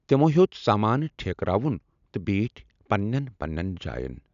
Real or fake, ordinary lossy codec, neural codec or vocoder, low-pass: real; none; none; 7.2 kHz